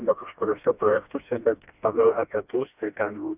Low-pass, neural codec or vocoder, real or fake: 3.6 kHz; codec, 16 kHz, 1 kbps, FreqCodec, smaller model; fake